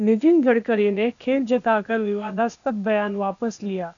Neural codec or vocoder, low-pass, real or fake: codec, 16 kHz, 0.8 kbps, ZipCodec; 7.2 kHz; fake